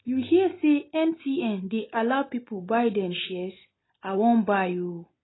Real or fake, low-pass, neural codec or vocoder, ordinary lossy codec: real; 7.2 kHz; none; AAC, 16 kbps